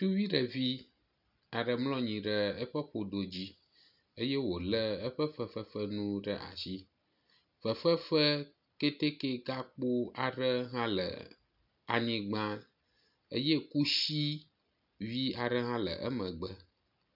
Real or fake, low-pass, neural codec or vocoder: real; 5.4 kHz; none